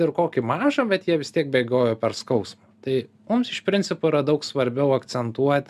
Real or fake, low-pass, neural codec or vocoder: real; 14.4 kHz; none